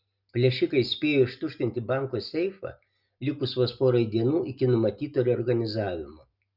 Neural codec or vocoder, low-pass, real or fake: none; 5.4 kHz; real